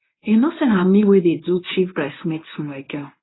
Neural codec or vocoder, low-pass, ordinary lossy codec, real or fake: codec, 24 kHz, 0.9 kbps, WavTokenizer, medium speech release version 1; 7.2 kHz; AAC, 16 kbps; fake